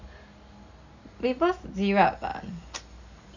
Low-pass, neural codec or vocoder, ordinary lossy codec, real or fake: 7.2 kHz; none; Opus, 64 kbps; real